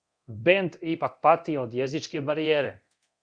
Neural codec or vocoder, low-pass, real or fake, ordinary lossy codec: codec, 24 kHz, 0.9 kbps, DualCodec; 9.9 kHz; fake; Opus, 64 kbps